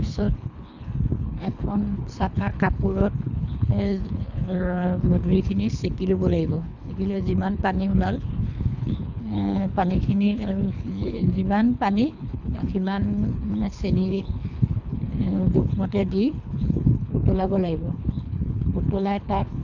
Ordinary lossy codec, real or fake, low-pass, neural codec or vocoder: none; fake; 7.2 kHz; codec, 24 kHz, 3 kbps, HILCodec